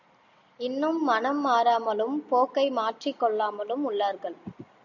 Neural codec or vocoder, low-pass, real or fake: none; 7.2 kHz; real